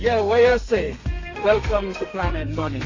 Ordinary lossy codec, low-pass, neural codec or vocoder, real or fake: AAC, 32 kbps; 7.2 kHz; codec, 32 kHz, 1.9 kbps, SNAC; fake